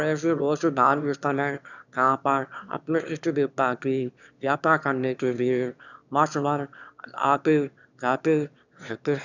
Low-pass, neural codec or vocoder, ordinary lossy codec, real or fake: 7.2 kHz; autoencoder, 22.05 kHz, a latent of 192 numbers a frame, VITS, trained on one speaker; none; fake